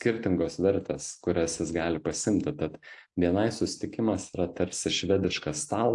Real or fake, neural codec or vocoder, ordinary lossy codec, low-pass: real; none; AAC, 64 kbps; 10.8 kHz